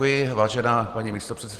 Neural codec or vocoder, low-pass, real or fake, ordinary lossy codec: none; 14.4 kHz; real; Opus, 16 kbps